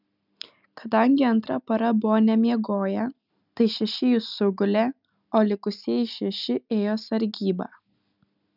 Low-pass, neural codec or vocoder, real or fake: 5.4 kHz; none; real